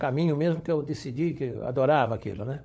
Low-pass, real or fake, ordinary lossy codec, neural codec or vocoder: none; fake; none; codec, 16 kHz, 16 kbps, FunCodec, trained on LibriTTS, 50 frames a second